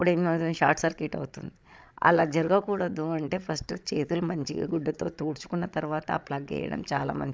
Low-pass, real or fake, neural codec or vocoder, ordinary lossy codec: none; fake; codec, 16 kHz, 16 kbps, FreqCodec, larger model; none